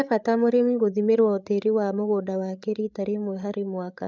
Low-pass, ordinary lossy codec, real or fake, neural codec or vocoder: 7.2 kHz; none; fake; codec, 16 kHz, 16 kbps, FreqCodec, larger model